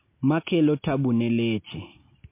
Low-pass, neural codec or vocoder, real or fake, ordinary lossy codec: 3.6 kHz; none; real; MP3, 24 kbps